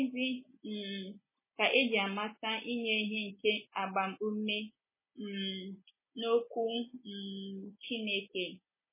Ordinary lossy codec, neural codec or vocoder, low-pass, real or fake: MP3, 16 kbps; none; 3.6 kHz; real